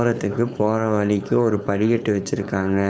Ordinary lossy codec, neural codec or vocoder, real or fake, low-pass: none; codec, 16 kHz, 4.8 kbps, FACodec; fake; none